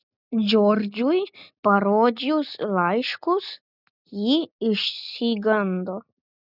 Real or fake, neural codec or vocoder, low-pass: real; none; 5.4 kHz